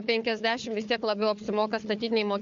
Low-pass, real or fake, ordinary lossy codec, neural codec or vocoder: 7.2 kHz; fake; MP3, 48 kbps; codec, 16 kHz, 4 kbps, FunCodec, trained on Chinese and English, 50 frames a second